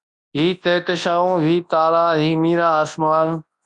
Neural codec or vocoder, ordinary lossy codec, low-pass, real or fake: codec, 24 kHz, 0.9 kbps, WavTokenizer, large speech release; Opus, 64 kbps; 10.8 kHz; fake